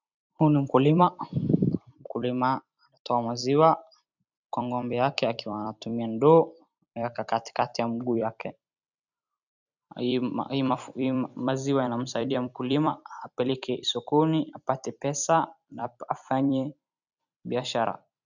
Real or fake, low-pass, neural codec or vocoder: fake; 7.2 kHz; vocoder, 44.1 kHz, 128 mel bands every 256 samples, BigVGAN v2